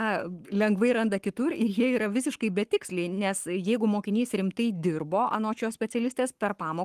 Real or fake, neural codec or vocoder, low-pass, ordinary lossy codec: fake; codec, 44.1 kHz, 7.8 kbps, Pupu-Codec; 14.4 kHz; Opus, 24 kbps